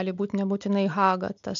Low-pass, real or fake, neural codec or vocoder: 7.2 kHz; real; none